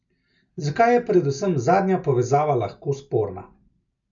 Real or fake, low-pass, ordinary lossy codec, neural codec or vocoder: real; 7.2 kHz; none; none